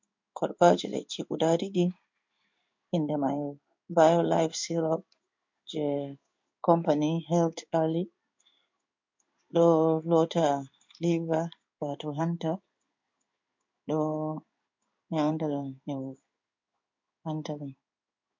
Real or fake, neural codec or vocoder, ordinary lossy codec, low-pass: fake; codec, 16 kHz in and 24 kHz out, 1 kbps, XY-Tokenizer; MP3, 48 kbps; 7.2 kHz